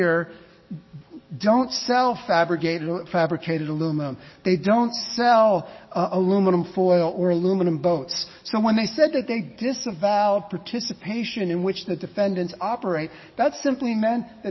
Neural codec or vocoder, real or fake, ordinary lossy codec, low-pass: codec, 44.1 kHz, 7.8 kbps, DAC; fake; MP3, 24 kbps; 7.2 kHz